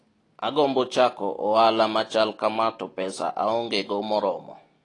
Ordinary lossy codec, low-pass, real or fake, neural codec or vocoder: AAC, 32 kbps; 10.8 kHz; real; none